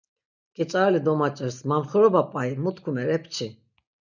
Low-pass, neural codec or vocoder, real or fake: 7.2 kHz; none; real